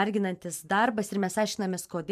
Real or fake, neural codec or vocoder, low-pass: fake; vocoder, 44.1 kHz, 128 mel bands every 512 samples, BigVGAN v2; 14.4 kHz